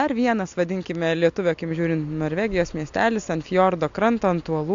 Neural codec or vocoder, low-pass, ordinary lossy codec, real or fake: none; 7.2 kHz; AAC, 64 kbps; real